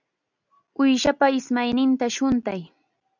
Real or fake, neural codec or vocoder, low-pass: real; none; 7.2 kHz